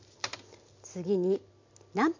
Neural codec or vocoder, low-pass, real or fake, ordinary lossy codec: none; 7.2 kHz; real; MP3, 64 kbps